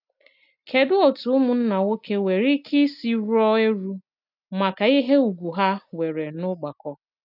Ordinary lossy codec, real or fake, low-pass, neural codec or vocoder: none; real; 5.4 kHz; none